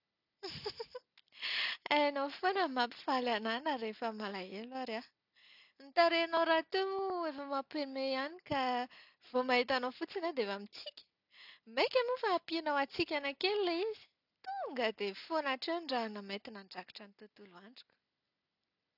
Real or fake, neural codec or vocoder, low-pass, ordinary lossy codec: real; none; 5.4 kHz; none